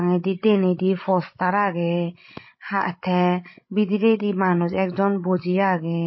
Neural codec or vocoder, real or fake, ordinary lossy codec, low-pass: codec, 16 kHz, 16 kbps, FreqCodec, larger model; fake; MP3, 24 kbps; 7.2 kHz